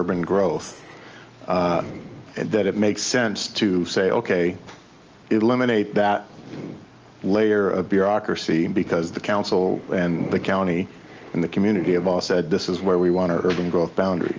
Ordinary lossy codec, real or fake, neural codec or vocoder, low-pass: Opus, 24 kbps; real; none; 7.2 kHz